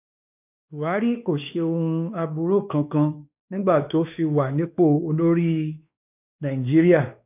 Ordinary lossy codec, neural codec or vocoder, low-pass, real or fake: none; codec, 16 kHz, 2 kbps, X-Codec, WavLM features, trained on Multilingual LibriSpeech; 3.6 kHz; fake